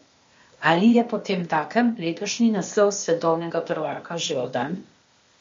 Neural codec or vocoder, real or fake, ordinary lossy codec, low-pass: codec, 16 kHz, 0.8 kbps, ZipCodec; fake; MP3, 48 kbps; 7.2 kHz